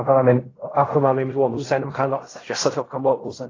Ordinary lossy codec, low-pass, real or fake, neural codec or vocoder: AAC, 32 kbps; 7.2 kHz; fake; codec, 16 kHz in and 24 kHz out, 0.4 kbps, LongCat-Audio-Codec, fine tuned four codebook decoder